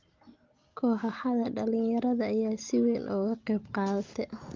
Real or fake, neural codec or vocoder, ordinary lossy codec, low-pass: real; none; Opus, 32 kbps; 7.2 kHz